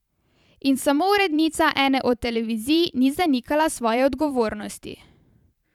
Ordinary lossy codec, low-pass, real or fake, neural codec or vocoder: none; 19.8 kHz; fake; vocoder, 44.1 kHz, 128 mel bands every 512 samples, BigVGAN v2